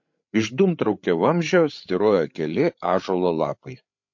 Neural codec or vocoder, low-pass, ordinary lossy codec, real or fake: codec, 16 kHz, 8 kbps, FreqCodec, larger model; 7.2 kHz; MP3, 48 kbps; fake